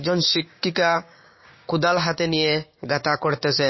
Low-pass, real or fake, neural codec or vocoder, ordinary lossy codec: 7.2 kHz; fake; codec, 16 kHz, 6 kbps, DAC; MP3, 24 kbps